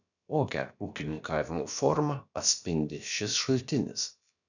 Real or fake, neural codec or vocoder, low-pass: fake; codec, 16 kHz, about 1 kbps, DyCAST, with the encoder's durations; 7.2 kHz